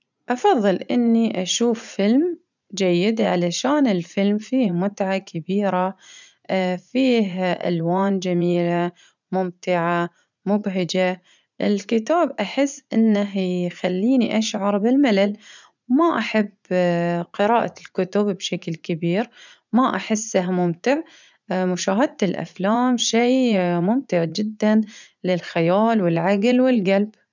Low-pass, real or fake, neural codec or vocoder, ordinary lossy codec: 7.2 kHz; fake; vocoder, 44.1 kHz, 80 mel bands, Vocos; none